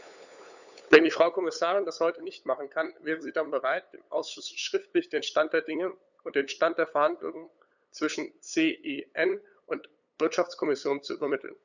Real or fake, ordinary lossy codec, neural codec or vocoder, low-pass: fake; none; codec, 16 kHz, 8 kbps, FunCodec, trained on LibriTTS, 25 frames a second; 7.2 kHz